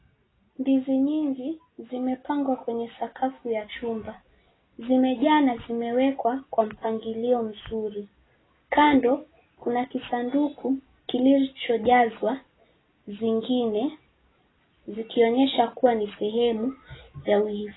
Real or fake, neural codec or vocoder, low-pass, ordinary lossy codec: real; none; 7.2 kHz; AAC, 16 kbps